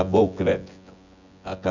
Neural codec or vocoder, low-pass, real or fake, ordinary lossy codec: vocoder, 24 kHz, 100 mel bands, Vocos; 7.2 kHz; fake; none